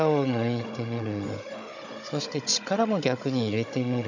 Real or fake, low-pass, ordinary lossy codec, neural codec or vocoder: fake; 7.2 kHz; none; codec, 16 kHz, 16 kbps, FunCodec, trained on Chinese and English, 50 frames a second